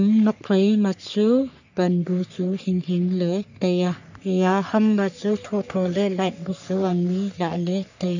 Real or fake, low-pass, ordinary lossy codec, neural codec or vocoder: fake; 7.2 kHz; none; codec, 44.1 kHz, 3.4 kbps, Pupu-Codec